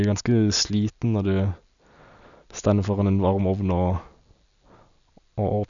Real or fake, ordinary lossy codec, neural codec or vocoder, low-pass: real; none; none; 7.2 kHz